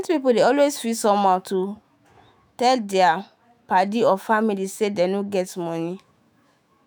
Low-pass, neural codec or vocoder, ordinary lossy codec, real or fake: none; autoencoder, 48 kHz, 128 numbers a frame, DAC-VAE, trained on Japanese speech; none; fake